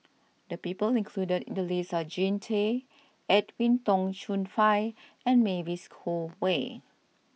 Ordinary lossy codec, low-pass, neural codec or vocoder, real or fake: none; none; none; real